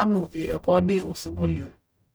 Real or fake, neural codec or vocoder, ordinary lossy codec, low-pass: fake; codec, 44.1 kHz, 0.9 kbps, DAC; none; none